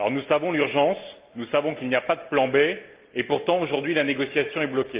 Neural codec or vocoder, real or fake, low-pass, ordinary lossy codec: none; real; 3.6 kHz; Opus, 24 kbps